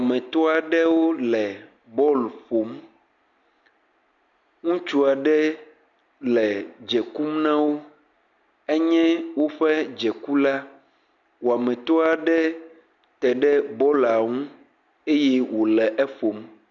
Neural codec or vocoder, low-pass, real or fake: none; 7.2 kHz; real